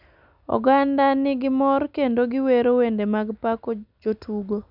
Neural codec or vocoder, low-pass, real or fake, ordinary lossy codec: none; 5.4 kHz; real; none